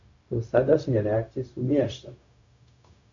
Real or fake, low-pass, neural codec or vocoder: fake; 7.2 kHz; codec, 16 kHz, 0.4 kbps, LongCat-Audio-Codec